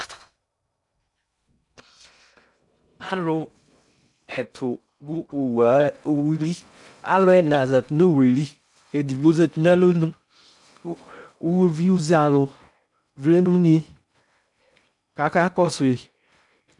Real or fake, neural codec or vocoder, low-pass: fake; codec, 16 kHz in and 24 kHz out, 0.6 kbps, FocalCodec, streaming, 2048 codes; 10.8 kHz